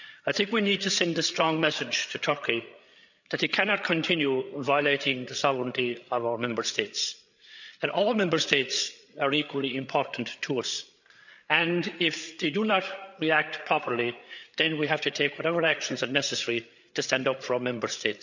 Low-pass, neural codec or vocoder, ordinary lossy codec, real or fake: 7.2 kHz; codec, 16 kHz, 8 kbps, FreqCodec, larger model; none; fake